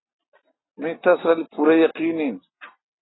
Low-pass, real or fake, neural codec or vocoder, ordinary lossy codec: 7.2 kHz; real; none; AAC, 16 kbps